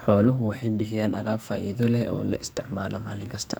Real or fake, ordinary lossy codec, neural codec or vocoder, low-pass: fake; none; codec, 44.1 kHz, 2.6 kbps, SNAC; none